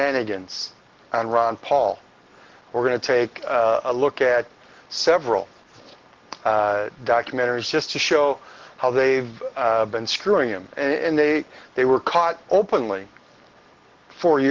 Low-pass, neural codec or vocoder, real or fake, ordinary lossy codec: 7.2 kHz; none; real; Opus, 16 kbps